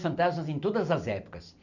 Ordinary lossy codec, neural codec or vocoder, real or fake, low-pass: none; none; real; 7.2 kHz